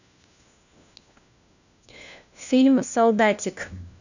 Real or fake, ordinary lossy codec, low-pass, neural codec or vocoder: fake; none; 7.2 kHz; codec, 16 kHz, 1 kbps, FunCodec, trained on LibriTTS, 50 frames a second